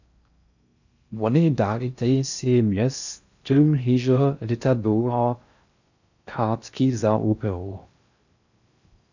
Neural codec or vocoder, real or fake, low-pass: codec, 16 kHz in and 24 kHz out, 0.6 kbps, FocalCodec, streaming, 4096 codes; fake; 7.2 kHz